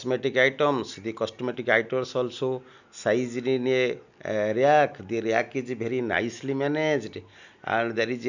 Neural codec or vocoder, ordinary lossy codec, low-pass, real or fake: none; none; 7.2 kHz; real